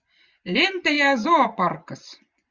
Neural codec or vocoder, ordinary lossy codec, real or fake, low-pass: vocoder, 24 kHz, 100 mel bands, Vocos; Opus, 64 kbps; fake; 7.2 kHz